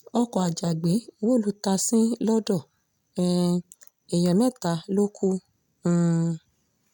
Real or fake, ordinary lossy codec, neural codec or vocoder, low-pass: real; none; none; 19.8 kHz